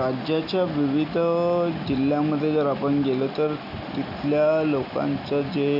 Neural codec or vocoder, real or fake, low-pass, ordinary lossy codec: none; real; 5.4 kHz; none